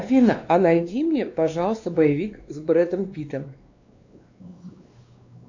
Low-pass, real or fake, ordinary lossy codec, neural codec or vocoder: 7.2 kHz; fake; AAC, 48 kbps; codec, 16 kHz, 2 kbps, X-Codec, WavLM features, trained on Multilingual LibriSpeech